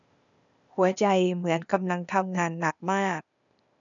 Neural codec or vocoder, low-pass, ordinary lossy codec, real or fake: codec, 16 kHz, 0.8 kbps, ZipCodec; 7.2 kHz; none; fake